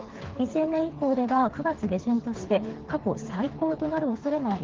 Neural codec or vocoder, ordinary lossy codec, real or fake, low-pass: codec, 16 kHz, 4 kbps, FreqCodec, smaller model; Opus, 16 kbps; fake; 7.2 kHz